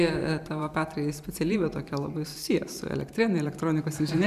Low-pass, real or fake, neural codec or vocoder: 14.4 kHz; fake; vocoder, 44.1 kHz, 128 mel bands every 512 samples, BigVGAN v2